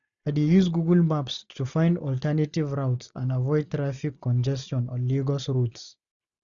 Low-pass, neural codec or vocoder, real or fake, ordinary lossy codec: 7.2 kHz; none; real; none